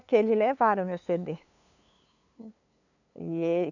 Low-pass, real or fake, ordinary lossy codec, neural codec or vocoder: 7.2 kHz; fake; none; codec, 16 kHz, 2 kbps, FunCodec, trained on LibriTTS, 25 frames a second